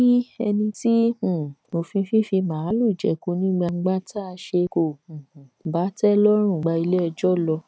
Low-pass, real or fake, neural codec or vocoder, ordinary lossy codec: none; real; none; none